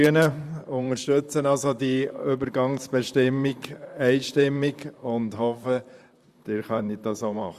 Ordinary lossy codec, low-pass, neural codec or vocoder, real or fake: Opus, 64 kbps; 14.4 kHz; vocoder, 44.1 kHz, 128 mel bands, Pupu-Vocoder; fake